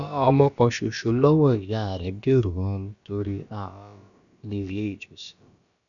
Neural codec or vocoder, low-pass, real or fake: codec, 16 kHz, about 1 kbps, DyCAST, with the encoder's durations; 7.2 kHz; fake